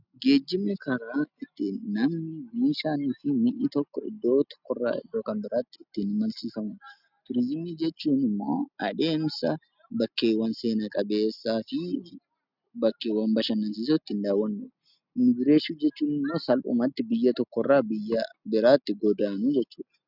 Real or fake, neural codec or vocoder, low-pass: real; none; 5.4 kHz